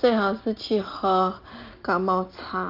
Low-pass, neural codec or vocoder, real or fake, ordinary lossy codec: 5.4 kHz; none; real; Opus, 24 kbps